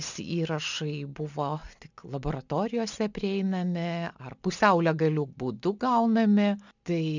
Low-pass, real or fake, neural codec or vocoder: 7.2 kHz; real; none